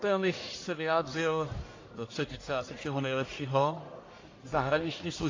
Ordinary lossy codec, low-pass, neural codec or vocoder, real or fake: AAC, 32 kbps; 7.2 kHz; codec, 44.1 kHz, 1.7 kbps, Pupu-Codec; fake